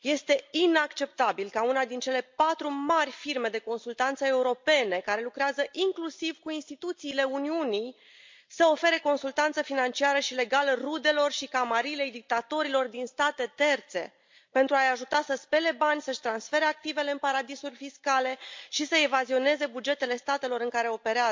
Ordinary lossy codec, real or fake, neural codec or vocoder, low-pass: MP3, 64 kbps; real; none; 7.2 kHz